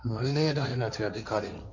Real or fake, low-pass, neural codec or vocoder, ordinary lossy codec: fake; 7.2 kHz; codec, 16 kHz, 1.1 kbps, Voila-Tokenizer; none